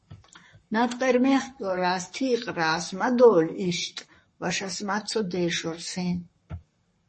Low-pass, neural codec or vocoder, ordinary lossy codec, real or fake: 10.8 kHz; codec, 44.1 kHz, 7.8 kbps, Pupu-Codec; MP3, 32 kbps; fake